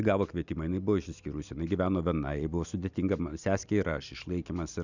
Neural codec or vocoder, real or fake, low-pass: none; real; 7.2 kHz